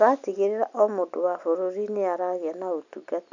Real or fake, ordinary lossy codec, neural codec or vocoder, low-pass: real; none; none; 7.2 kHz